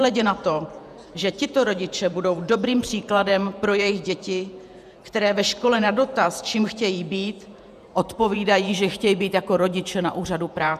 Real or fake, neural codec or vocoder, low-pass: fake; vocoder, 48 kHz, 128 mel bands, Vocos; 14.4 kHz